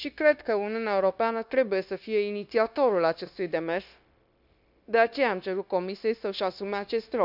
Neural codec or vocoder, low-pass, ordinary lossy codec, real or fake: codec, 16 kHz, 0.9 kbps, LongCat-Audio-Codec; 5.4 kHz; none; fake